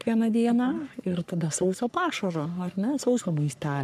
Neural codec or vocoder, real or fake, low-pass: codec, 44.1 kHz, 3.4 kbps, Pupu-Codec; fake; 14.4 kHz